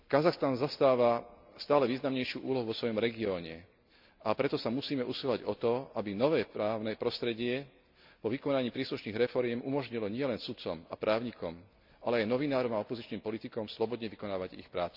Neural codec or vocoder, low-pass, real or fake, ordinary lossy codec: none; 5.4 kHz; real; MP3, 48 kbps